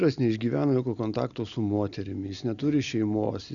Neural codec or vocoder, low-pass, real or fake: none; 7.2 kHz; real